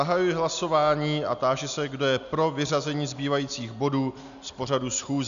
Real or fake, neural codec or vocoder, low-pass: real; none; 7.2 kHz